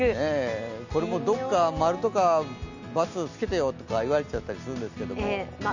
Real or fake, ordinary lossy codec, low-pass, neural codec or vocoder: real; none; 7.2 kHz; none